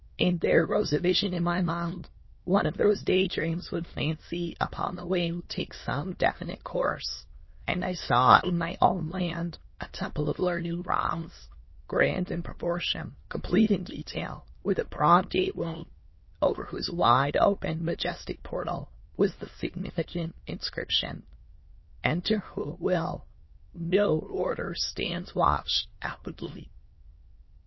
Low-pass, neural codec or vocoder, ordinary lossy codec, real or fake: 7.2 kHz; autoencoder, 22.05 kHz, a latent of 192 numbers a frame, VITS, trained on many speakers; MP3, 24 kbps; fake